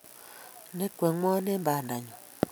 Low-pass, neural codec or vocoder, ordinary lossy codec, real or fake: none; none; none; real